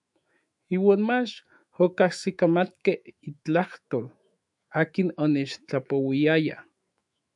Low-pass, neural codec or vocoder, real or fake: 10.8 kHz; autoencoder, 48 kHz, 128 numbers a frame, DAC-VAE, trained on Japanese speech; fake